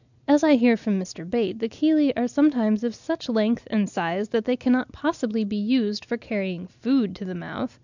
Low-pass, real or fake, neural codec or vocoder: 7.2 kHz; real; none